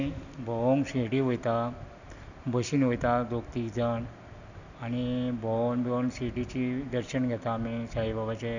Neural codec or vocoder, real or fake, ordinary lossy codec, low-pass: none; real; none; 7.2 kHz